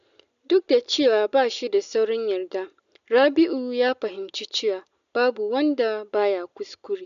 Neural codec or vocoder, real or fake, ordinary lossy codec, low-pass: none; real; MP3, 64 kbps; 7.2 kHz